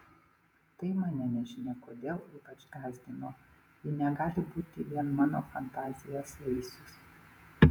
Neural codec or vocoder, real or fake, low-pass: vocoder, 48 kHz, 128 mel bands, Vocos; fake; 19.8 kHz